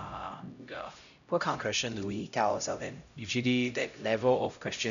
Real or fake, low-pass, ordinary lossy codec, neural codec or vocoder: fake; 7.2 kHz; none; codec, 16 kHz, 0.5 kbps, X-Codec, HuBERT features, trained on LibriSpeech